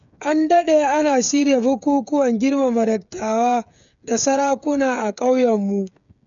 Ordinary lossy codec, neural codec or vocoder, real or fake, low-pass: none; codec, 16 kHz, 8 kbps, FreqCodec, smaller model; fake; 7.2 kHz